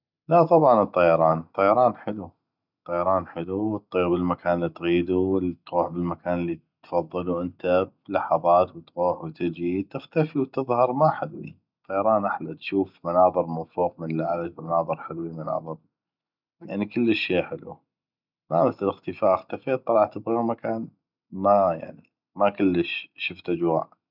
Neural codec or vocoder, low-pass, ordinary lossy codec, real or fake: none; 5.4 kHz; none; real